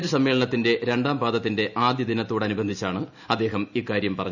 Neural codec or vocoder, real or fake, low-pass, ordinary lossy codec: none; real; 7.2 kHz; none